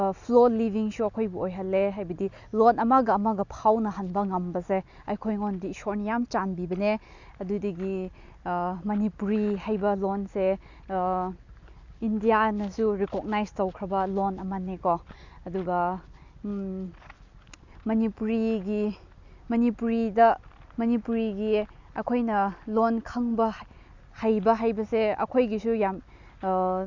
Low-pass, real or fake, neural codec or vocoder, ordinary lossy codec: 7.2 kHz; real; none; Opus, 64 kbps